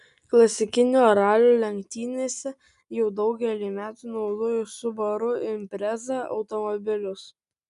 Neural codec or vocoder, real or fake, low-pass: none; real; 10.8 kHz